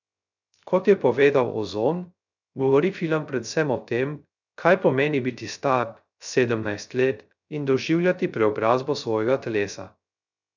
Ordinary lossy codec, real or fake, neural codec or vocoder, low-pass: none; fake; codec, 16 kHz, 0.3 kbps, FocalCodec; 7.2 kHz